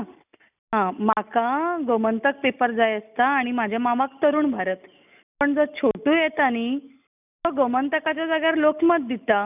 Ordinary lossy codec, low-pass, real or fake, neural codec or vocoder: none; 3.6 kHz; real; none